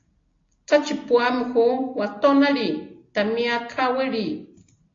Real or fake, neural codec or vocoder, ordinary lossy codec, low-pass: real; none; AAC, 32 kbps; 7.2 kHz